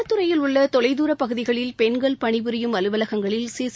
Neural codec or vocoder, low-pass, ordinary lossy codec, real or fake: none; none; none; real